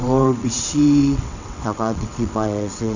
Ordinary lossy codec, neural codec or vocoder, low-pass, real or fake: none; vocoder, 22.05 kHz, 80 mel bands, WaveNeXt; 7.2 kHz; fake